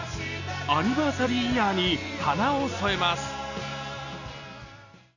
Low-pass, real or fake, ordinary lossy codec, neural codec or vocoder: 7.2 kHz; real; none; none